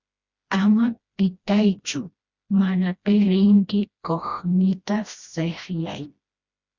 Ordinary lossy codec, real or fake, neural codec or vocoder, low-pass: Opus, 64 kbps; fake; codec, 16 kHz, 1 kbps, FreqCodec, smaller model; 7.2 kHz